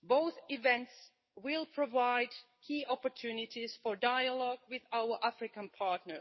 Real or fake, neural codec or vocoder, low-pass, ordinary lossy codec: fake; codec, 44.1 kHz, 7.8 kbps, DAC; 7.2 kHz; MP3, 24 kbps